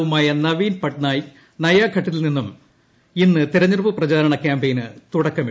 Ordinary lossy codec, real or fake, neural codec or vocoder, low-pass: none; real; none; none